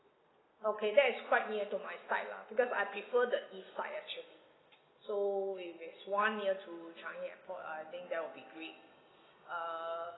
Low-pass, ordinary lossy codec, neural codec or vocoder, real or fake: 7.2 kHz; AAC, 16 kbps; none; real